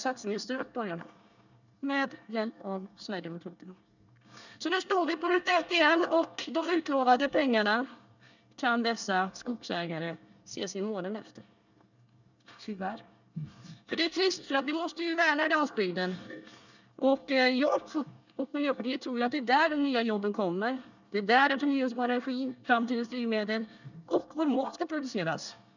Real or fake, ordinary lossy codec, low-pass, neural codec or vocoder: fake; none; 7.2 kHz; codec, 24 kHz, 1 kbps, SNAC